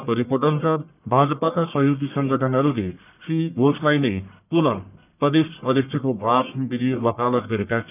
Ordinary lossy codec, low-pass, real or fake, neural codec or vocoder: none; 3.6 kHz; fake; codec, 44.1 kHz, 1.7 kbps, Pupu-Codec